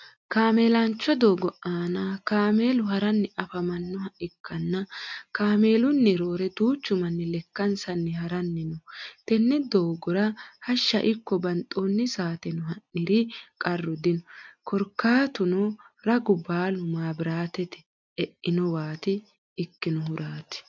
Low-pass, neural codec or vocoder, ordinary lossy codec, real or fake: 7.2 kHz; none; MP3, 64 kbps; real